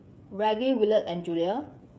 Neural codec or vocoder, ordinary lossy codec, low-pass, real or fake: codec, 16 kHz, 8 kbps, FreqCodec, smaller model; none; none; fake